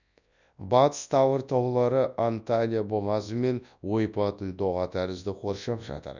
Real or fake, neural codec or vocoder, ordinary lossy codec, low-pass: fake; codec, 24 kHz, 0.9 kbps, WavTokenizer, large speech release; none; 7.2 kHz